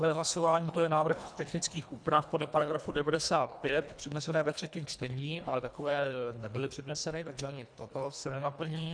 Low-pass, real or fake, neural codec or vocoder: 9.9 kHz; fake; codec, 24 kHz, 1.5 kbps, HILCodec